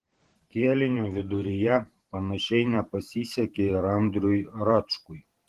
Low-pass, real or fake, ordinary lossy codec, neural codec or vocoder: 14.4 kHz; fake; Opus, 16 kbps; vocoder, 44.1 kHz, 128 mel bands every 512 samples, BigVGAN v2